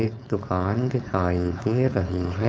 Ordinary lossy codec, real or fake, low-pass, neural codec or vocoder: none; fake; none; codec, 16 kHz, 4.8 kbps, FACodec